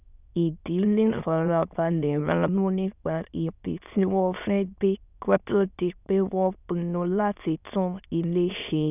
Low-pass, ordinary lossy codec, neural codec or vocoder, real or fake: 3.6 kHz; none; autoencoder, 22.05 kHz, a latent of 192 numbers a frame, VITS, trained on many speakers; fake